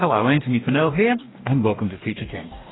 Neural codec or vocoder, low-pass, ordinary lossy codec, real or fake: codec, 44.1 kHz, 2.6 kbps, DAC; 7.2 kHz; AAC, 16 kbps; fake